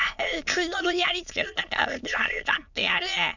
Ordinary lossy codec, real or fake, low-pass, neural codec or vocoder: none; fake; 7.2 kHz; autoencoder, 22.05 kHz, a latent of 192 numbers a frame, VITS, trained on many speakers